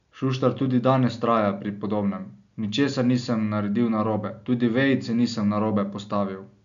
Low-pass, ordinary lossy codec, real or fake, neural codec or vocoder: 7.2 kHz; MP3, 96 kbps; real; none